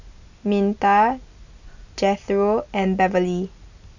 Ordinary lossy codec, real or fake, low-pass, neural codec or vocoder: none; real; 7.2 kHz; none